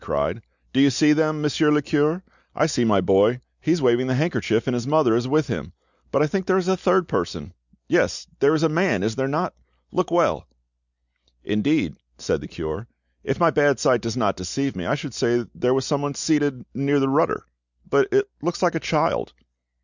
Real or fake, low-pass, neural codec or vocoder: real; 7.2 kHz; none